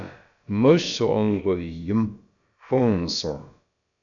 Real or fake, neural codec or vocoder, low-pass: fake; codec, 16 kHz, about 1 kbps, DyCAST, with the encoder's durations; 7.2 kHz